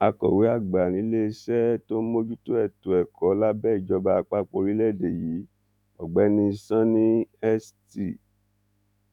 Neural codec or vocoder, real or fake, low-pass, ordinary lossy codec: autoencoder, 48 kHz, 128 numbers a frame, DAC-VAE, trained on Japanese speech; fake; 19.8 kHz; none